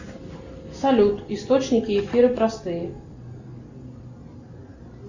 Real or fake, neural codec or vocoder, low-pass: real; none; 7.2 kHz